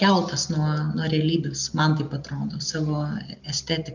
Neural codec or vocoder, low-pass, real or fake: none; 7.2 kHz; real